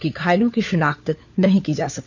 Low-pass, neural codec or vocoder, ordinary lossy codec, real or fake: 7.2 kHz; codec, 16 kHz, 4 kbps, FunCodec, trained on LibriTTS, 50 frames a second; none; fake